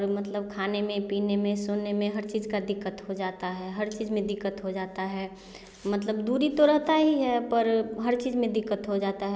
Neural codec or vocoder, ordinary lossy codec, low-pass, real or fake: none; none; none; real